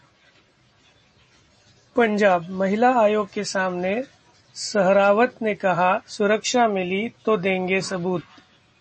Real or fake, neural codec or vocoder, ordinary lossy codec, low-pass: real; none; MP3, 32 kbps; 10.8 kHz